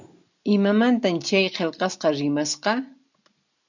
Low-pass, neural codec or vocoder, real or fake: 7.2 kHz; none; real